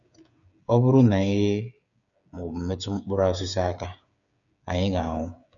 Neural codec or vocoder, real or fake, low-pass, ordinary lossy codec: codec, 16 kHz, 8 kbps, FreqCodec, smaller model; fake; 7.2 kHz; none